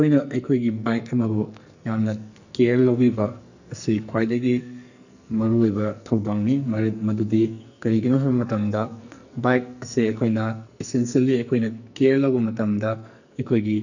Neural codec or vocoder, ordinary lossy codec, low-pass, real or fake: codec, 44.1 kHz, 2.6 kbps, SNAC; none; 7.2 kHz; fake